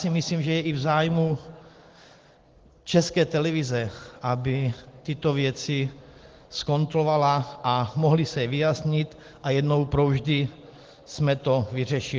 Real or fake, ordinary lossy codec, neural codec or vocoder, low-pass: real; Opus, 16 kbps; none; 7.2 kHz